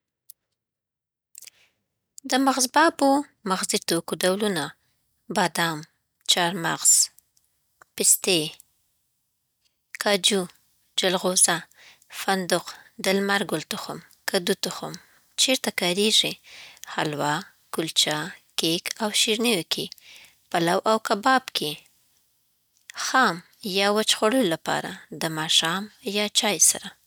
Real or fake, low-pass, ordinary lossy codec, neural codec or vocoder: real; none; none; none